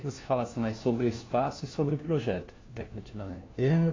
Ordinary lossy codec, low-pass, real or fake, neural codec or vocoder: AAC, 32 kbps; 7.2 kHz; fake; codec, 16 kHz, 1 kbps, FunCodec, trained on LibriTTS, 50 frames a second